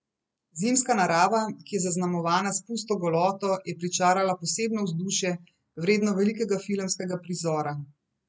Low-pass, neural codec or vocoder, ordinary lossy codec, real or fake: none; none; none; real